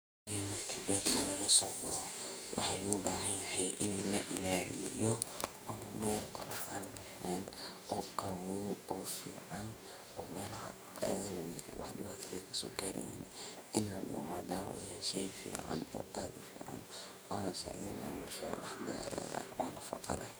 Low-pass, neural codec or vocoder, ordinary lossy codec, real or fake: none; codec, 44.1 kHz, 2.6 kbps, DAC; none; fake